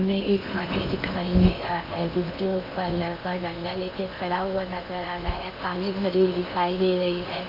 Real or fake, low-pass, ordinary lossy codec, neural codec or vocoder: fake; 5.4 kHz; none; codec, 16 kHz in and 24 kHz out, 0.8 kbps, FocalCodec, streaming, 65536 codes